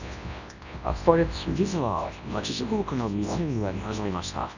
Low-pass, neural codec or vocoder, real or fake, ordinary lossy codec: 7.2 kHz; codec, 24 kHz, 0.9 kbps, WavTokenizer, large speech release; fake; none